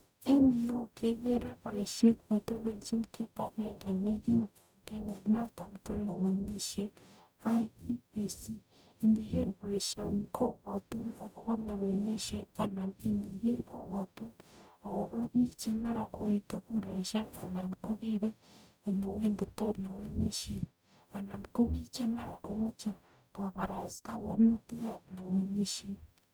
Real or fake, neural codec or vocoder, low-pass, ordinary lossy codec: fake; codec, 44.1 kHz, 0.9 kbps, DAC; none; none